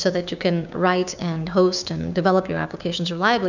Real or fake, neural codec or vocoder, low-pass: fake; codec, 16 kHz, 6 kbps, DAC; 7.2 kHz